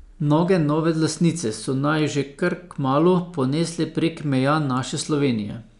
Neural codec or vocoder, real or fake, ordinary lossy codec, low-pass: none; real; none; 10.8 kHz